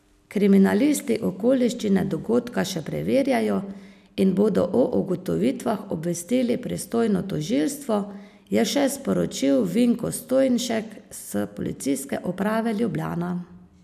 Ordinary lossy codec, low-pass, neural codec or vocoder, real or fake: none; 14.4 kHz; none; real